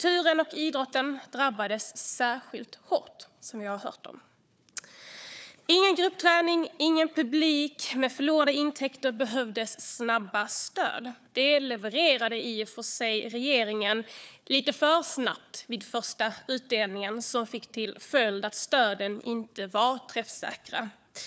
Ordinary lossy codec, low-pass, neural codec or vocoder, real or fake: none; none; codec, 16 kHz, 4 kbps, FunCodec, trained on Chinese and English, 50 frames a second; fake